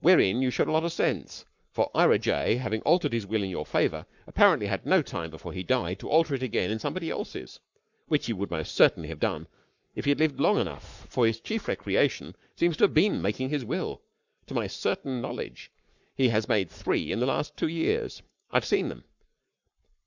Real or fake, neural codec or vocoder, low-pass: fake; codec, 44.1 kHz, 7.8 kbps, Pupu-Codec; 7.2 kHz